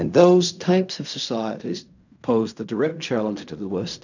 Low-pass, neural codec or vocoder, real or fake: 7.2 kHz; codec, 16 kHz in and 24 kHz out, 0.4 kbps, LongCat-Audio-Codec, fine tuned four codebook decoder; fake